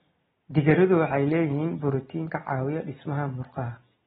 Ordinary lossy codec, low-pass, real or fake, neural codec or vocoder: AAC, 16 kbps; 19.8 kHz; real; none